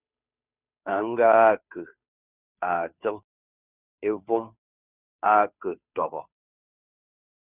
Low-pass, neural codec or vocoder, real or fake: 3.6 kHz; codec, 16 kHz, 2 kbps, FunCodec, trained on Chinese and English, 25 frames a second; fake